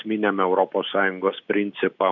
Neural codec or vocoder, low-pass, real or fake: none; 7.2 kHz; real